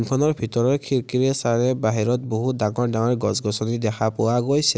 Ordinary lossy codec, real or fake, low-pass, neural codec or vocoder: none; real; none; none